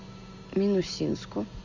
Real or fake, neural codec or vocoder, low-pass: real; none; 7.2 kHz